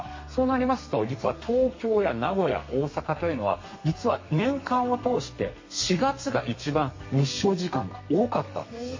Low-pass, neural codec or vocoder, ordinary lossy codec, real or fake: 7.2 kHz; codec, 32 kHz, 1.9 kbps, SNAC; MP3, 32 kbps; fake